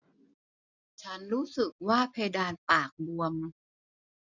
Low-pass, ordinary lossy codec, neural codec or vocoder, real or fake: 7.2 kHz; none; none; real